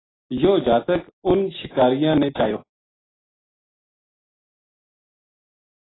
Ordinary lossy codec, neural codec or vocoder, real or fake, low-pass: AAC, 16 kbps; none; real; 7.2 kHz